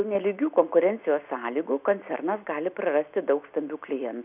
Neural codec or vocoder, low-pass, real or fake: none; 3.6 kHz; real